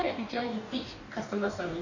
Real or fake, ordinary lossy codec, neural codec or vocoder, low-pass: fake; none; codec, 44.1 kHz, 2.6 kbps, DAC; 7.2 kHz